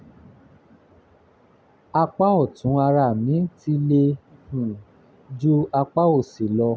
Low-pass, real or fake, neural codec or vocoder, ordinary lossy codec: none; real; none; none